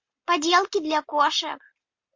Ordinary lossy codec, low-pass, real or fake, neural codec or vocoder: MP3, 48 kbps; 7.2 kHz; real; none